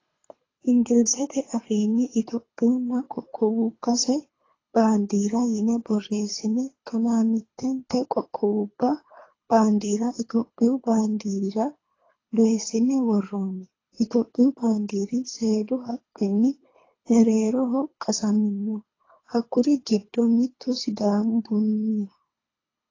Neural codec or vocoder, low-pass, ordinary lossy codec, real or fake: codec, 24 kHz, 3 kbps, HILCodec; 7.2 kHz; AAC, 32 kbps; fake